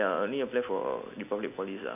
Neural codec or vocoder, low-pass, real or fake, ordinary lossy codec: none; 3.6 kHz; real; none